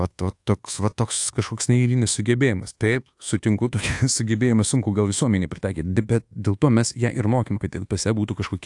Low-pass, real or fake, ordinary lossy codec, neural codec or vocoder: 10.8 kHz; fake; AAC, 64 kbps; codec, 24 kHz, 1.2 kbps, DualCodec